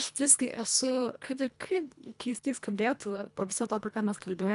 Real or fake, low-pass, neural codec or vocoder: fake; 10.8 kHz; codec, 24 kHz, 1.5 kbps, HILCodec